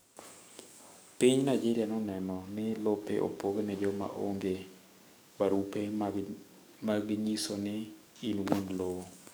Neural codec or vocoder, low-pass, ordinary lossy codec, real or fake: codec, 44.1 kHz, 7.8 kbps, DAC; none; none; fake